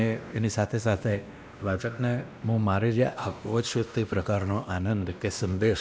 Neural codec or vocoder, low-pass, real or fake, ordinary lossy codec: codec, 16 kHz, 1 kbps, X-Codec, WavLM features, trained on Multilingual LibriSpeech; none; fake; none